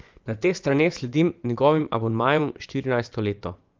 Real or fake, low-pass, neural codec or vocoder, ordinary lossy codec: fake; 7.2 kHz; vocoder, 24 kHz, 100 mel bands, Vocos; Opus, 32 kbps